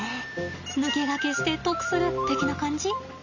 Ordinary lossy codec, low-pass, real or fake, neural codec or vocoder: none; 7.2 kHz; real; none